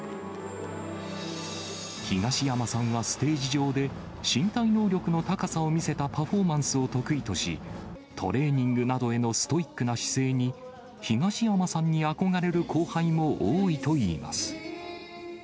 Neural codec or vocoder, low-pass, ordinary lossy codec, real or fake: none; none; none; real